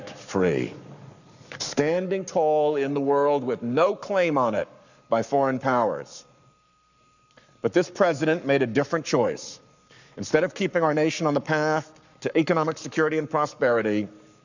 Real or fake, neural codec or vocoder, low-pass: fake; codec, 44.1 kHz, 7.8 kbps, Pupu-Codec; 7.2 kHz